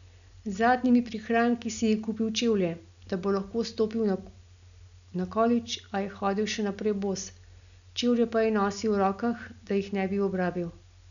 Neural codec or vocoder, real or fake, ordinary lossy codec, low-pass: none; real; none; 7.2 kHz